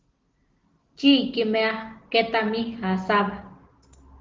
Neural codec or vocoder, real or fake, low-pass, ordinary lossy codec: none; real; 7.2 kHz; Opus, 16 kbps